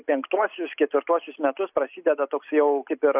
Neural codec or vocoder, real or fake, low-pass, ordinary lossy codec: none; real; 3.6 kHz; AAC, 32 kbps